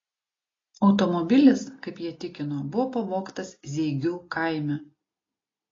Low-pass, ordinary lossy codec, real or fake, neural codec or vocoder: 7.2 kHz; AAC, 32 kbps; real; none